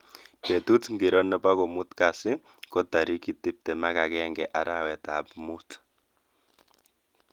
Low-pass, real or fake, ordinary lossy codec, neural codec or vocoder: 19.8 kHz; real; Opus, 24 kbps; none